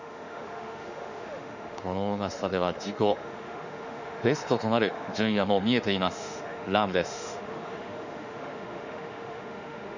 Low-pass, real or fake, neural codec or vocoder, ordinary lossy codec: 7.2 kHz; fake; autoencoder, 48 kHz, 32 numbers a frame, DAC-VAE, trained on Japanese speech; none